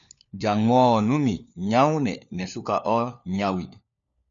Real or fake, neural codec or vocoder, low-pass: fake; codec, 16 kHz, 4 kbps, FunCodec, trained on LibriTTS, 50 frames a second; 7.2 kHz